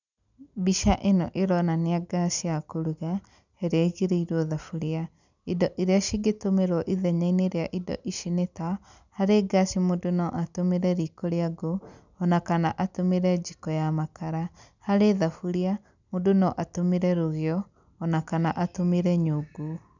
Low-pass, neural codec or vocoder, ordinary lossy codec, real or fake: 7.2 kHz; none; none; real